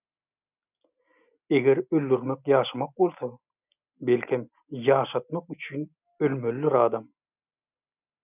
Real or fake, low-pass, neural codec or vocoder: real; 3.6 kHz; none